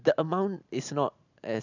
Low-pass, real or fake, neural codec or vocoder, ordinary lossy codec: 7.2 kHz; real; none; none